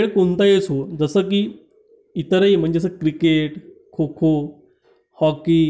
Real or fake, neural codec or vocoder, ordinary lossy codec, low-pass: real; none; none; none